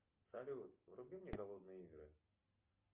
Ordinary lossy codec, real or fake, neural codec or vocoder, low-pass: Opus, 24 kbps; real; none; 3.6 kHz